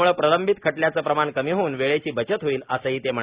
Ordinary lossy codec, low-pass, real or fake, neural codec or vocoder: Opus, 64 kbps; 3.6 kHz; real; none